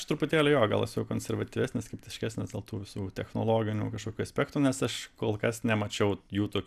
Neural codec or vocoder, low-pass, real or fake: none; 14.4 kHz; real